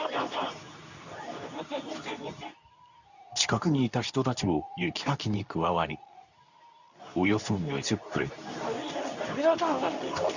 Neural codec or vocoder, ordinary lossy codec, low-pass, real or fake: codec, 24 kHz, 0.9 kbps, WavTokenizer, medium speech release version 2; none; 7.2 kHz; fake